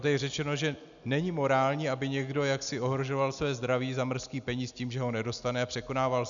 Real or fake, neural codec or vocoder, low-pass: real; none; 7.2 kHz